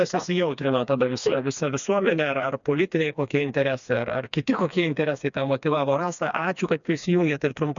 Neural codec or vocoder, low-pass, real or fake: codec, 16 kHz, 2 kbps, FreqCodec, smaller model; 7.2 kHz; fake